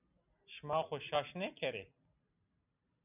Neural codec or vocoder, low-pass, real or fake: none; 3.6 kHz; real